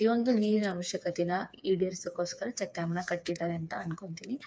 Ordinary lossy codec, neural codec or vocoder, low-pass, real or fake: none; codec, 16 kHz, 4 kbps, FreqCodec, smaller model; none; fake